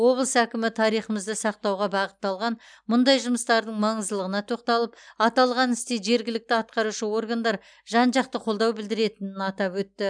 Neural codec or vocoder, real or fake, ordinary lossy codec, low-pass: none; real; none; 9.9 kHz